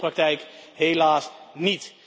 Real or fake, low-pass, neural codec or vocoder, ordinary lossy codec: real; none; none; none